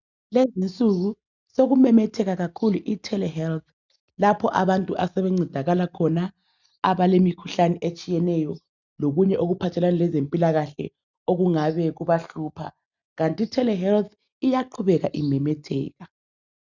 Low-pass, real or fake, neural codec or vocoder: 7.2 kHz; real; none